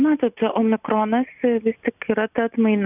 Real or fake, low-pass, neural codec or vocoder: real; 3.6 kHz; none